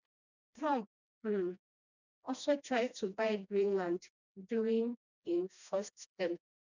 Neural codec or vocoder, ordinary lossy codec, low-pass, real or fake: codec, 16 kHz, 1 kbps, FreqCodec, smaller model; none; 7.2 kHz; fake